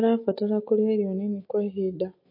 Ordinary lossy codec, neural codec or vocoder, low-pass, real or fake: MP3, 32 kbps; none; 5.4 kHz; real